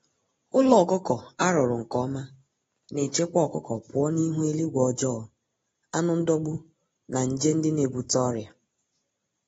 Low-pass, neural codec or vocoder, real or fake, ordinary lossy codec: 19.8 kHz; none; real; AAC, 24 kbps